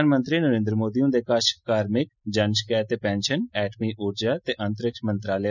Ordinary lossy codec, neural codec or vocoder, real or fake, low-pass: none; none; real; 7.2 kHz